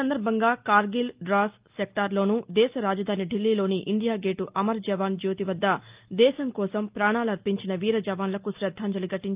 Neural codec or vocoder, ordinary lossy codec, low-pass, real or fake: none; Opus, 24 kbps; 3.6 kHz; real